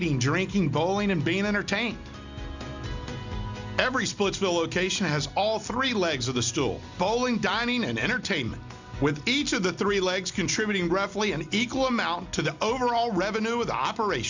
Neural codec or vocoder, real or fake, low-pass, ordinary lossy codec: none; real; 7.2 kHz; Opus, 64 kbps